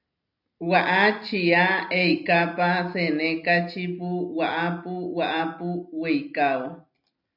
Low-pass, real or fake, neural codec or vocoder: 5.4 kHz; real; none